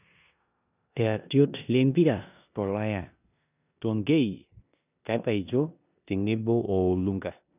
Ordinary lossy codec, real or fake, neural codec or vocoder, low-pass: none; fake; codec, 16 kHz in and 24 kHz out, 0.9 kbps, LongCat-Audio-Codec, four codebook decoder; 3.6 kHz